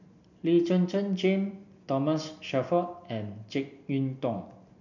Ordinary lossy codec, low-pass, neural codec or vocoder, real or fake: none; 7.2 kHz; none; real